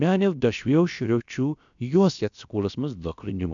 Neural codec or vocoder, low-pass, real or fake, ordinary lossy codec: codec, 16 kHz, about 1 kbps, DyCAST, with the encoder's durations; 7.2 kHz; fake; none